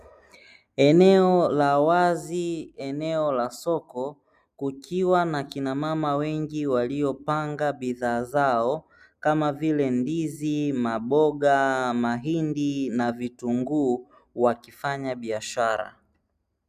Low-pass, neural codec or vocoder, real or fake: 14.4 kHz; none; real